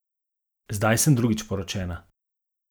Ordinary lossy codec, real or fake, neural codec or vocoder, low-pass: none; real; none; none